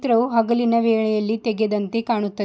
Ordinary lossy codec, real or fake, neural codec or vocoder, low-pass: none; real; none; none